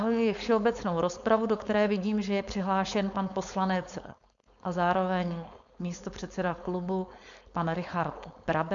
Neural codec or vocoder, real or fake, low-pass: codec, 16 kHz, 4.8 kbps, FACodec; fake; 7.2 kHz